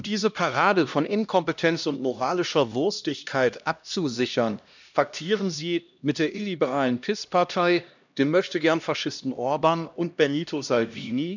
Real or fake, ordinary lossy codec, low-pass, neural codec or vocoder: fake; none; 7.2 kHz; codec, 16 kHz, 1 kbps, X-Codec, HuBERT features, trained on LibriSpeech